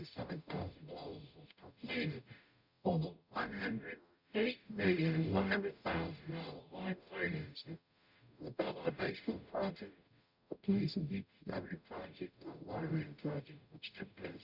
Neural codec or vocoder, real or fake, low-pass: codec, 44.1 kHz, 0.9 kbps, DAC; fake; 5.4 kHz